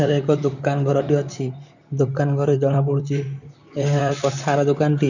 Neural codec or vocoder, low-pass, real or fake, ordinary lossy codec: vocoder, 44.1 kHz, 128 mel bands, Pupu-Vocoder; 7.2 kHz; fake; none